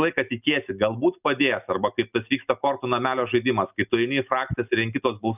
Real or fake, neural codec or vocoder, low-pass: real; none; 3.6 kHz